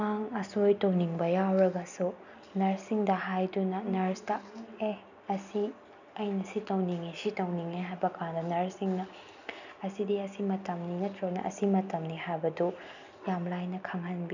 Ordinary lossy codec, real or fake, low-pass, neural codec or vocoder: none; real; 7.2 kHz; none